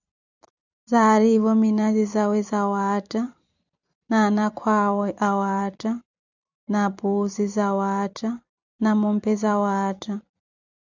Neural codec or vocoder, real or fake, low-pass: vocoder, 44.1 kHz, 128 mel bands every 256 samples, BigVGAN v2; fake; 7.2 kHz